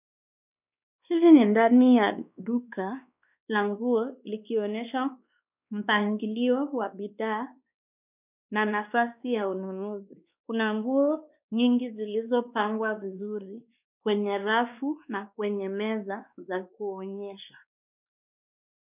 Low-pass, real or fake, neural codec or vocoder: 3.6 kHz; fake; codec, 16 kHz, 2 kbps, X-Codec, WavLM features, trained on Multilingual LibriSpeech